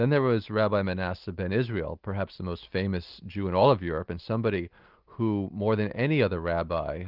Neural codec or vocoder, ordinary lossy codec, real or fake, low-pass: none; Opus, 24 kbps; real; 5.4 kHz